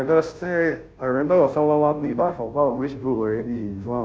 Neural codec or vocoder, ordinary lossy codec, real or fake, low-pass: codec, 16 kHz, 0.5 kbps, FunCodec, trained on Chinese and English, 25 frames a second; none; fake; none